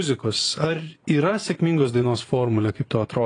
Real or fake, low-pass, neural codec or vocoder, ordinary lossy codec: fake; 9.9 kHz; vocoder, 22.05 kHz, 80 mel bands, Vocos; AAC, 32 kbps